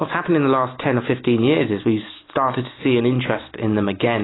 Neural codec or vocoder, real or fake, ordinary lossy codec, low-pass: none; real; AAC, 16 kbps; 7.2 kHz